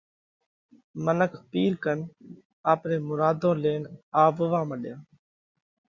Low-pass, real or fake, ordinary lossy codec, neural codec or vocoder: 7.2 kHz; real; Opus, 64 kbps; none